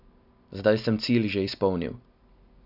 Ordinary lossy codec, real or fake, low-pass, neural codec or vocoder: none; real; 5.4 kHz; none